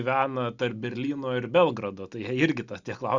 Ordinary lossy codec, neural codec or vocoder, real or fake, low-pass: Opus, 64 kbps; none; real; 7.2 kHz